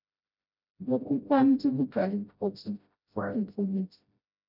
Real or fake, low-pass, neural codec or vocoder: fake; 5.4 kHz; codec, 16 kHz, 0.5 kbps, FreqCodec, smaller model